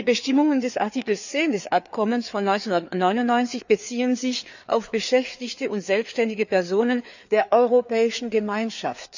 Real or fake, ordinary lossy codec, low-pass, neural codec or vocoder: fake; none; 7.2 kHz; codec, 16 kHz, 4 kbps, FreqCodec, larger model